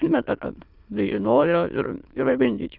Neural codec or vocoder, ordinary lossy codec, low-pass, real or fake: autoencoder, 22.05 kHz, a latent of 192 numbers a frame, VITS, trained on many speakers; Opus, 32 kbps; 5.4 kHz; fake